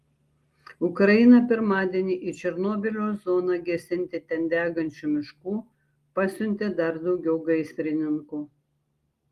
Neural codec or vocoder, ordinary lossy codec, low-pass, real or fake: none; Opus, 24 kbps; 14.4 kHz; real